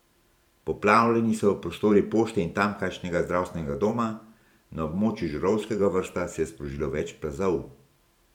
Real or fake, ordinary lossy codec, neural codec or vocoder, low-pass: fake; none; vocoder, 44.1 kHz, 128 mel bands every 512 samples, BigVGAN v2; 19.8 kHz